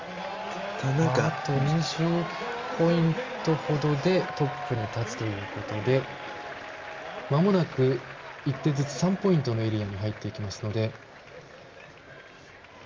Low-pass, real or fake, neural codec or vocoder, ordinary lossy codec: 7.2 kHz; real; none; Opus, 32 kbps